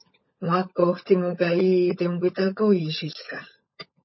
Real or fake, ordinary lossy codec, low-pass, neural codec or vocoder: fake; MP3, 24 kbps; 7.2 kHz; codec, 16 kHz, 16 kbps, FunCodec, trained on LibriTTS, 50 frames a second